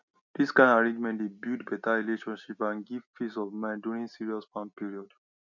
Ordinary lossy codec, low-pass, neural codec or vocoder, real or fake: none; 7.2 kHz; none; real